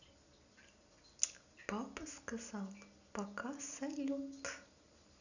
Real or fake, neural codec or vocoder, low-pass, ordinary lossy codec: real; none; 7.2 kHz; none